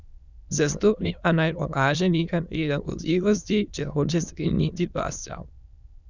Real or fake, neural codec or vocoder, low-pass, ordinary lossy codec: fake; autoencoder, 22.05 kHz, a latent of 192 numbers a frame, VITS, trained on many speakers; 7.2 kHz; none